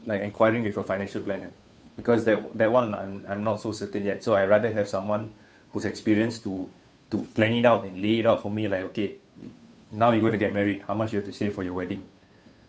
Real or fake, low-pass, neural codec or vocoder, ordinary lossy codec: fake; none; codec, 16 kHz, 2 kbps, FunCodec, trained on Chinese and English, 25 frames a second; none